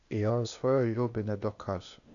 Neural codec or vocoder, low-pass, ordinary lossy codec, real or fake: codec, 16 kHz, 0.8 kbps, ZipCodec; 7.2 kHz; MP3, 96 kbps; fake